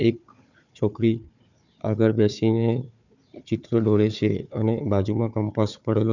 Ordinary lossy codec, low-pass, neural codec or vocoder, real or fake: none; 7.2 kHz; codec, 16 kHz, 4 kbps, FunCodec, trained on Chinese and English, 50 frames a second; fake